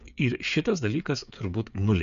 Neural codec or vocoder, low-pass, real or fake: codec, 16 kHz, 8 kbps, FreqCodec, smaller model; 7.2 kHz; fake